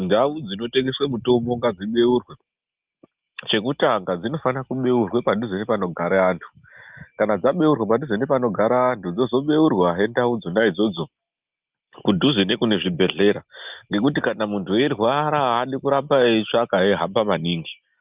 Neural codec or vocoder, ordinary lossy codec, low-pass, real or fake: none; Opus, 24 kbps; 3.6 kHz; real